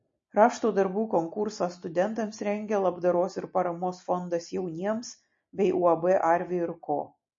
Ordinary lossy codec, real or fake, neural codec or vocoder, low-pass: MP3, 32 kbps; real; none; 7.2 kHz